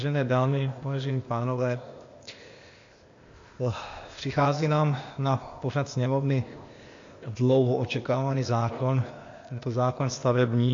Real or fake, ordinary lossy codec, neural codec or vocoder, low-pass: fake; AAC, 48 kbps; codec, 16 kHz, 0.8 kbps, ZipCodec; 7.2 kHz